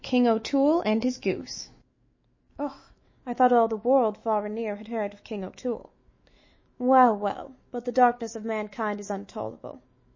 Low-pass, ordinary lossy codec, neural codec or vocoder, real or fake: 7.2 kHz; MP3, 32 kbps; autoencoder, 48 kHz, 128 numbers a frame, DAC-VAE, trained on Japanese speech; fake